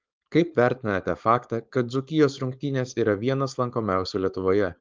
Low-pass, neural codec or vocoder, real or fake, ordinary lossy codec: 7.2 kHz; codec, 16 kHz, 4.8 kbps, FACodec; fake; Opus, 32 kbps